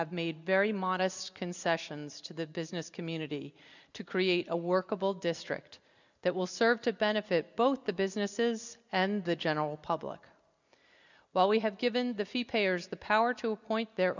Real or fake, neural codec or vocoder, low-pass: real; none; 7.2 kHz